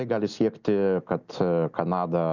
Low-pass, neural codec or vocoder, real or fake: 7.2 kHz; none; real